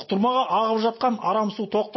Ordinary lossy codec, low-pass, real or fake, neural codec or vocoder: MP3, 24 kbps; 7.2 kHz; real; none